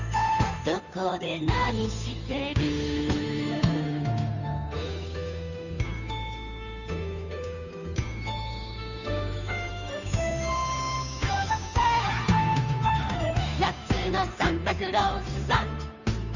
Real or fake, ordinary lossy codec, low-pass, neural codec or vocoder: fake; none; 7.2 kHz; codec, 16 kHz, 2 kbps, FunCodec, trained on Chinese and English, 25 frames a second